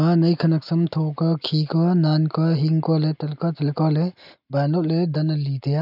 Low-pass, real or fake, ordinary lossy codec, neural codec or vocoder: 5.4 kHz; real; none; none